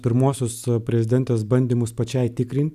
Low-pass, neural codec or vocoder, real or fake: 14.4 kHz; none; real